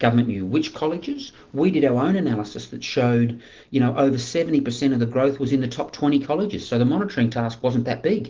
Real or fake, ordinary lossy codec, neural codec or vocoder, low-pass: real; Opus, 16 kbps; none; 7.2 kHz